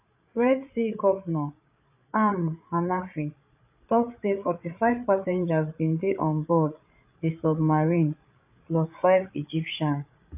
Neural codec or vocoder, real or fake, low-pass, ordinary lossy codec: codec, 16 kHz, 8 kbps, FreqCodec, larger model; fake; 3.6 kHz; AAC, 32 kbps